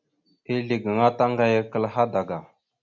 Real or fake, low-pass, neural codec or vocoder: real; 7.2 kHz; none